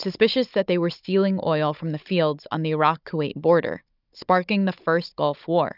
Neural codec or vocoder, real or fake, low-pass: codec, 16 kHz, 16 kbps, FunCodec, trained on Chinese and English, 50 frames a second; fake; 5.4 kHz